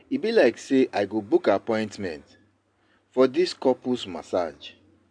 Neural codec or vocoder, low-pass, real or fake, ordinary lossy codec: none; 9.9 kHz; real; MP3, 64 kbps